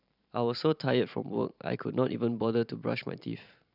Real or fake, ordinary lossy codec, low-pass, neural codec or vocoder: fake; none; 5.4 kHz; vocoder, 44.1 kHz, 128 mel bands every 512 samples, BigVGAN v2